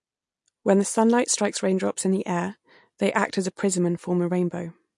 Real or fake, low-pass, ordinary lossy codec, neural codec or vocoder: real; 10.8 kHz; MP3, 48 kbps; none